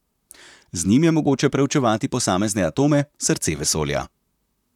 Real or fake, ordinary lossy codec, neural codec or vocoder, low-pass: fake; none; vocoder, 44.1 kHz, 128 mel bands, Pupu-Vocoder; 19.8 kHz